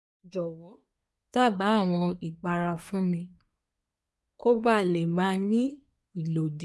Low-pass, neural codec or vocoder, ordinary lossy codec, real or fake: none; codec, 24 kHz, 1 kbps, SNAC; none; fake